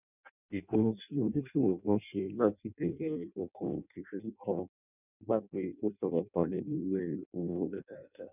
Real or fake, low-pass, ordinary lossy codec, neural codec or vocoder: fake; 3.6 kHz; none; codec, 16 kHz in and 24 kHz out, 0.6 kbps, FireRedTTS-2 codec